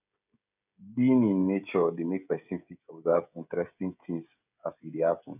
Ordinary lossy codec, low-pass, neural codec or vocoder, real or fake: none; 3.6 kHz; codec, 16 kHz, 16 kbps, FreqCodec, smaller model; fake